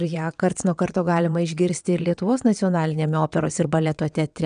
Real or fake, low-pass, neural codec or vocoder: fake; 9.9 kHz; vocoder, 22.05 kHz, 80 mel bands, WaveNeXt